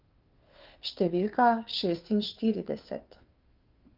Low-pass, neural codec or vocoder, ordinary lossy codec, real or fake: 5.4 kHz; codec, 16 kHz, 2 kbps, FunCodec, trained on Chinese and English, 25 frames a second; Opus, 24 kbps; fake